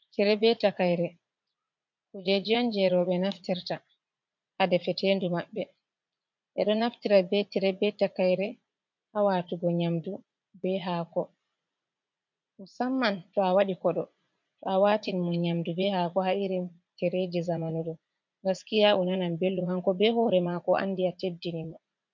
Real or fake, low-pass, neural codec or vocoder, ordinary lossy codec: fake; 7.2 kHz; vocoder, 44.1 kHz, 80 mel bands, Vocos; MP3, 64 kbps